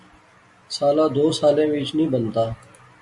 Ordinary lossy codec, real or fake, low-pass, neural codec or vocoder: MP3, 48 kbps; real; 10.8 kHz; none